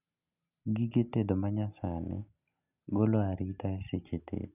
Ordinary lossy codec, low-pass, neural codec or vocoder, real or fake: none; 3.6 kHz; none; real